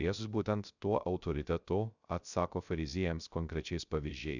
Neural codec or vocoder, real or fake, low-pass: codec, 16 kHz, 0.3 kbps, FocalCodec; fake; 7.2 kHz